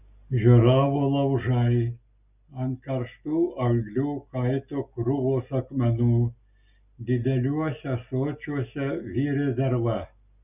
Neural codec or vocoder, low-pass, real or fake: none; 3.6 kHz; real